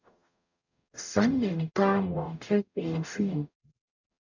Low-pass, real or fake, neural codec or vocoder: 7.2 kHz; fake; codec, 44.1 kHz, 0.9 kbps, DAC